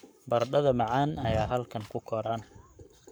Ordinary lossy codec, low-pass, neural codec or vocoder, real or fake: none; none; codec, 44.1 kHz, 7.8 kbps, Pupu-Codec; fake